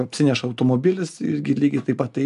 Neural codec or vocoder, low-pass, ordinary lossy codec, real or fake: none; 10.8 kHz; MP3, 96 kbps; real